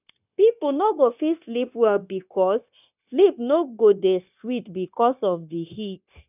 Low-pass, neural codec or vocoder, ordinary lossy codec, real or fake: 3.6 kHz; codec, 16 kHz, 0.9 kbps, LongCat-Audio-Codec; none; fake